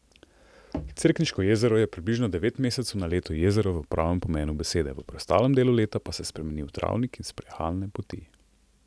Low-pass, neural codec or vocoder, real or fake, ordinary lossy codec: none; none; real; none